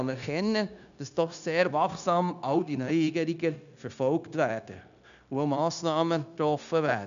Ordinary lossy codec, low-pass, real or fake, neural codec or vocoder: none; 7.2 kHz; fake; codec, 16 kHz, 0.9 kbps, LongCat-Audio-Codec